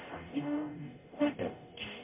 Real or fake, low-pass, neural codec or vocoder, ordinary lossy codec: fake; 3.6 kHz; codec, 44.1 kHz, 0.9 kbps, DAC; MP3, 32 kbps